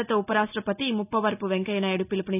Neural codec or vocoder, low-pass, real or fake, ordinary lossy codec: none; 3.6 kHz; real; none